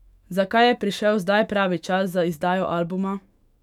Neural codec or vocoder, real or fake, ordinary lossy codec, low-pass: autoencoder, 48 kHz, 128 numbers a frame, DAC-VAE, trained on Japanese speech; fake; none; 19.8 kHz